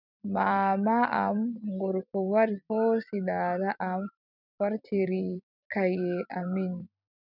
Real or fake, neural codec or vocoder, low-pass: fake; vocoder, 44.1 kHz, 128 mel bands every 256 samples, BigVGAN v2; 5.4 kHz